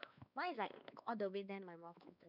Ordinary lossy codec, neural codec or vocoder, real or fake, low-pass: none; codec, 16 kHz, 2 kbps, X-Codec, WavLM features, trained on Multilingual LibriSpeech; fake; 5.4 kHz